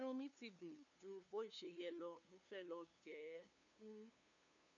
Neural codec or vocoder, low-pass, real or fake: codec, 16 kHz, 2 kbps, FunCodec, trained on LibriTTS, 25 frames a second; 7.2 kHz; fake